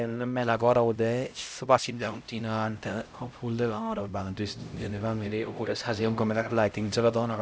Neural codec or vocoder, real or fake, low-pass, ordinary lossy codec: codec, 16 kHz, 0.5 kbps, X-Codec, HuBERT features, trained on LibriSpeech; fake; none; none